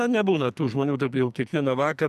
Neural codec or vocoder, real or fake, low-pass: codec, 44.1 kHz, 2.6 kbps, SNAC; fake; 14.4 kHz